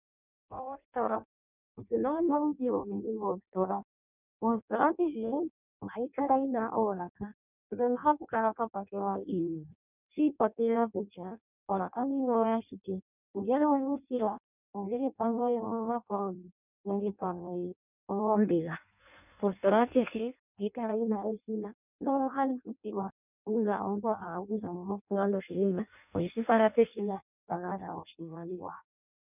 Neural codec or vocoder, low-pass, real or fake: codec, 16 kHz in and 24 kHz out, 0.6 kbps, FireRedTTS-2 codec; 3.6 kHz; fake